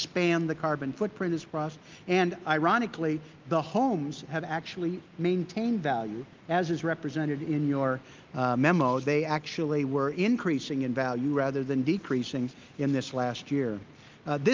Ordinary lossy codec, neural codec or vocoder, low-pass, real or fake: Opus, 24 kbps; none; 7.2 kHz; real